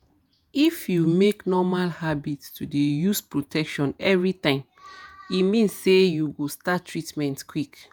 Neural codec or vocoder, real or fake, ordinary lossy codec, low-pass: vocoder, 48 kHz, 128 mel bands, Vocos; fake; none; none